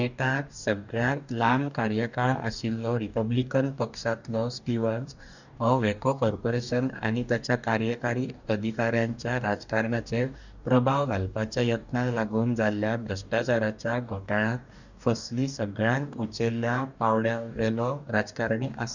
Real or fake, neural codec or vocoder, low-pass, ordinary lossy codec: fake; codec, 44.1 kHz, 2.6 kbps, DAC; 7.2 kHz; none